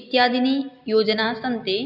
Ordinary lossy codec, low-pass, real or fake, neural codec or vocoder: none; 5.4 kHz; real; none